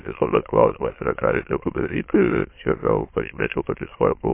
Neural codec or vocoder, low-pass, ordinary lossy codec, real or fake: autoencoder, 22.05 kHz, a latent of 192 numbers a frame, VITS, trained on many speakers; 3.6 kHz; MP3, 24 kbps; fake